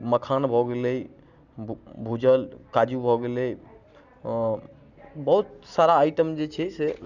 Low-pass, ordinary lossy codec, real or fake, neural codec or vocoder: 7.2 kHz; none; real; none